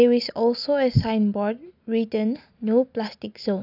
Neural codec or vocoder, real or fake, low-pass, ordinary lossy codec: none; real; 5.4 kHz; none